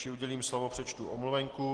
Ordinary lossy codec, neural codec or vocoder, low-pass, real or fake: Opus, 16 kbps; none; 9.9 kHz; real